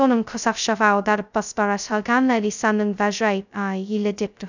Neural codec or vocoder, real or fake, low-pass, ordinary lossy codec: codec, 16 kHz, 0.2 kbps, FocalCodec; fake; 7.2 kHz; none